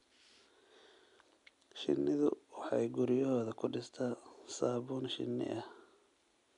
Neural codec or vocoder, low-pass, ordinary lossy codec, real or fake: none; 10.8 kHz; none; real